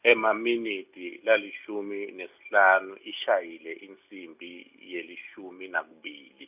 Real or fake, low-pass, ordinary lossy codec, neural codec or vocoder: real; 3.6 kHz; none; none